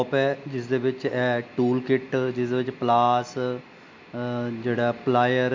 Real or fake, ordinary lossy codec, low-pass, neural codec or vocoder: real; MP3, 48 kbps; 7.2 kHz; none